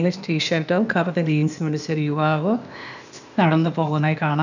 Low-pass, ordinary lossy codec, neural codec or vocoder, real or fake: 7.2 kHz; none; codec, 16 kHz, 0.8 kbps, ZipCodec; fake